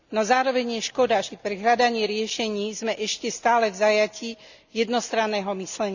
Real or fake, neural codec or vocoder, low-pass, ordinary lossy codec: real; none; 7.2 kHz; none